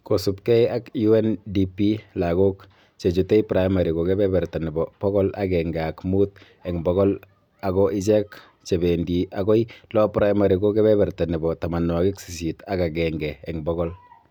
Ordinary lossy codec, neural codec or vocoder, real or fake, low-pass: MP3, 96 kbps; none; real; 19.8 kHz